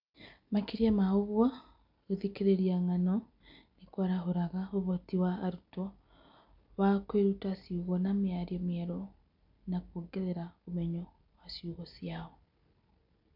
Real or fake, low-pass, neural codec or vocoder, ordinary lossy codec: real; 5.4 kHz; none; none